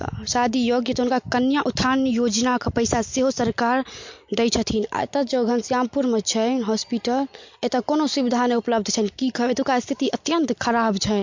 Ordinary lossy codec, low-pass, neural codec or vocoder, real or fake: MP3, 48 kbps; 7.2 kHz; none; real